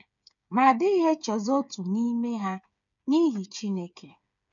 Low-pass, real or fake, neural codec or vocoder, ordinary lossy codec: 7.2 kHz; fake; codec, 16 kHz, 8 kbps, FreqCodec, smaller model; none